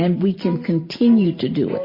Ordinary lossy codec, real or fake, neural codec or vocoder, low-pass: MP3, 24 kbps; fake; vocoder, 44.1 kHz, 128 mel bands every 512 samples, BigVGAN v2; 5.4 kHz